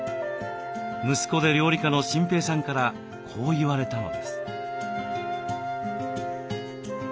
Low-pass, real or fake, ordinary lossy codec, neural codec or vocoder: none; real; none; none